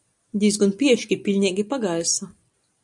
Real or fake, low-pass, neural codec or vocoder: real; 10.8 kHz; none